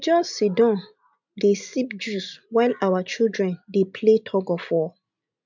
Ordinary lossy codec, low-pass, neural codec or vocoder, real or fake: MP3, 64 kbps; 7.2 kHz; none; real